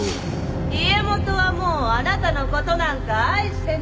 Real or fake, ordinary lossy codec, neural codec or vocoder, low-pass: real; none; none; none